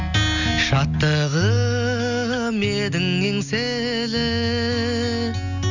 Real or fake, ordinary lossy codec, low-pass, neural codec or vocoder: real; none; 7.2 kHz; none